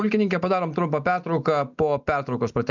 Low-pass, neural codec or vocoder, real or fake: 7.2 kHz; none; real